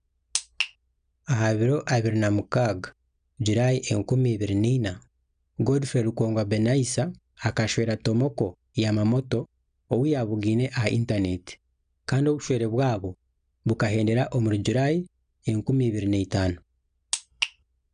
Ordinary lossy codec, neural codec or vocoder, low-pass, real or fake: none; none; 9.9 kHz; real